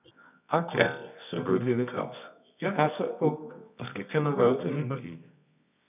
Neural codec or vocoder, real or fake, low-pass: codec, 24 kHz, 0.9 kbps, WavTokenizer, medium music audio release; fake; 3.6 kHz